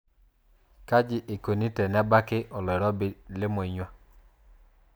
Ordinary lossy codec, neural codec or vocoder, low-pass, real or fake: none; none; none; real